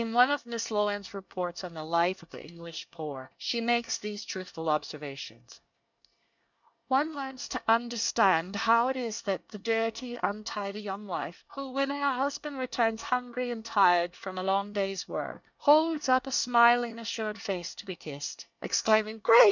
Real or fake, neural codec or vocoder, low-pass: fake; codec, 24 kHz, 1 kbps, SNAC; 7.2 kHz